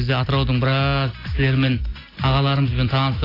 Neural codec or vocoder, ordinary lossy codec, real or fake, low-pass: none; AAC, 24 kbps; real; 5.4 kHz